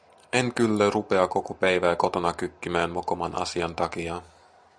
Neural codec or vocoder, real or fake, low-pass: none; real; 9.9 kHz